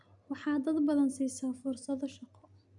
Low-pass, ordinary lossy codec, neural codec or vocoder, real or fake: 10.8 kHz; none; none; real